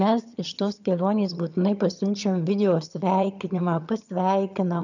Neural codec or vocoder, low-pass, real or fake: vocoder, 22.05 kHz, 80 mel bands, HiFi-GAN; 7.2 kHz; fake